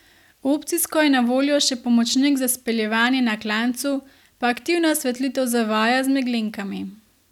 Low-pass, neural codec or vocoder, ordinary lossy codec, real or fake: 19.8 kHz; none; none; real